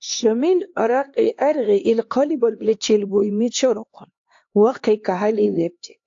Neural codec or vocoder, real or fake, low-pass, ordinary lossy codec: codec, 16 kHz, 2 kbps, X-Codec, HuBERT features, trained on LibriSpeech; fake; 7.2 kHz; AAC, 48 kbps